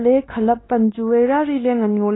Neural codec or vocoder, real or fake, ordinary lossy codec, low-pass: codec, 16 kHz, 2 kbps, X-Codec, WavLM features, trained on Multilingual LibriSpeech; fake; AAC, 16 kbps; 7.2 kHz